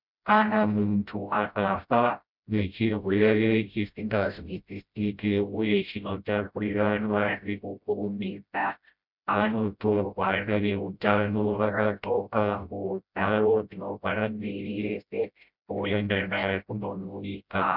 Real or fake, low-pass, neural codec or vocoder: fake; 5.4 kHz; codec, 16 kHz, 0.5 kbps, FreqCodec, smaller model